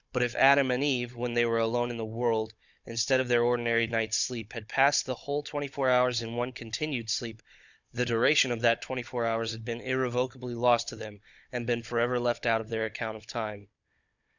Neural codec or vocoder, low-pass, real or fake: codec, 16 kHz, 16 kbps, FunCodec, trained on Chinese and English, 50 frames a second; 7.2 kHz; fake